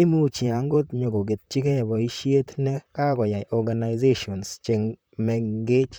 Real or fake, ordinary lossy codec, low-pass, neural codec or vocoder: fake; none; none; vocoder, 44.1 kHz, 128 mel bands, Pupu-Vocoder